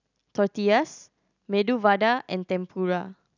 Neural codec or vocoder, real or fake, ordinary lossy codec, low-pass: none; real; none; 7.2 kHz